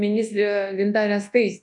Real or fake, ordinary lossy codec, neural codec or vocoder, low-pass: fake; MP3, 96 kbps; codec, 24 kHz, 0.9 kbps, WavTokenizer, large speech release; 10.8 kHz